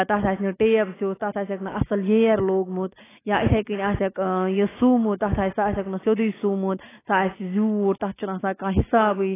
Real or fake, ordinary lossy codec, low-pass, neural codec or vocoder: fake; AAC, 16 kbps; 3.6 kHz; autoencoder, 48 kHz, 128 numbers a frame, DAC-VAE, trained on Japanese speech